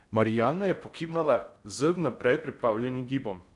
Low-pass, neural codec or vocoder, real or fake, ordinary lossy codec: 10.8 kHz; codec, 16 kHz in and 24 kHz out, 0.6 kbps, FocalCodec, streaming, 4096 codes; fake; none